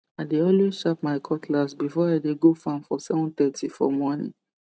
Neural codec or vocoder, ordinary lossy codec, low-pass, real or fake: none; none; none; real